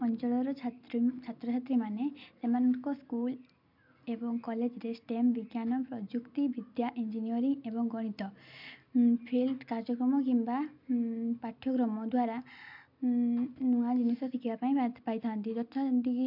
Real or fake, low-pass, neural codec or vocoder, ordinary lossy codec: real; 5.4 kHz; none; MP3, 48 kbps